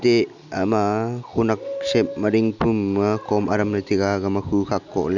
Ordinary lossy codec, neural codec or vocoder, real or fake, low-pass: none; none; real; 7.2 kHz